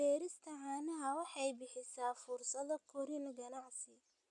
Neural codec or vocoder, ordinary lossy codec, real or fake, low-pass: none; none; real; 10.8 kHz